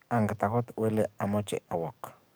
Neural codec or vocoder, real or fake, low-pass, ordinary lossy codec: codec, 44.1 kHz, 7.8 kbps, DAC; fake; none; none